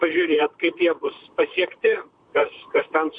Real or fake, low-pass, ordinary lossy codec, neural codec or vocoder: fake; 9.9 kHz; MP3, 64 kbps; vocoder, 44.1 kHz, 128 mel bands, Pupu-Vocoder